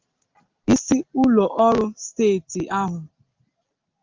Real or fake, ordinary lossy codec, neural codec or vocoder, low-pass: real; Opus, 24 kbps; none; 7.2 kHz